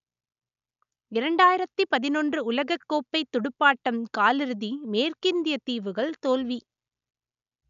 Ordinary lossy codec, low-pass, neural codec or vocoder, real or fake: none; 7.2 kHz; none; real